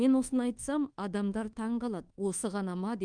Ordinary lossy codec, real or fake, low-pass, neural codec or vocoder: Opus, 24 kbps; fake; 9.9 kHz; codec, 24 kHz, 1.2 kbps, DualCodec